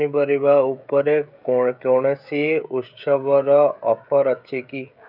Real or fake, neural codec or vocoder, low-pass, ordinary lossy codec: fake; codec, 16 kHz, 16 kbps, FreqCodec, smaller model; 5.4 kHz; none